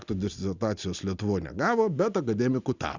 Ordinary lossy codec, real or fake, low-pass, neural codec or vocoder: Opus, 64 kbps; real; 7.2 kHz; none